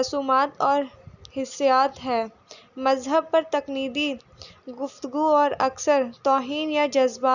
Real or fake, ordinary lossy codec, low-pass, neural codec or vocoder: real; none; 7.2 kHz; none